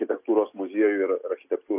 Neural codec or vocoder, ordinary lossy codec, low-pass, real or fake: none; AAC, 24 kbps; 3.6 kHz; real